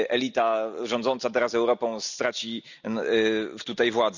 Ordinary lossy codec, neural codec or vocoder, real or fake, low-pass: none; none; real; 7.2 kHz